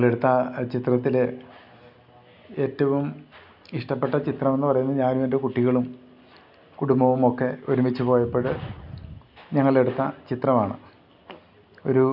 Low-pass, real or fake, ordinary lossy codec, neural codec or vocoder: 5.4 kHz; real; none; none